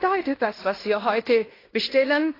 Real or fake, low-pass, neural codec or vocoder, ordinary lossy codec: fake; 5.4 kHz; codec, 16 kHz in and 24 kHz out, 0.9 kbps, LongCat-Audio-Codec, fine tuned four codebook decoder; AAC, 24 kbps